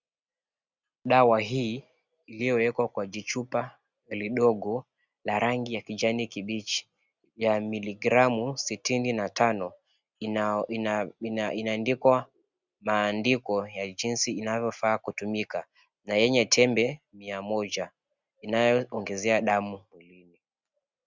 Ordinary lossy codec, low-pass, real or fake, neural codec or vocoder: Opus, 64 kbps; 7.2 kHz; real; none